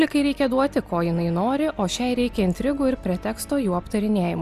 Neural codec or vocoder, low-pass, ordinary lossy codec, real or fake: none; 14.4 kHz; Opus, 64 kbps; real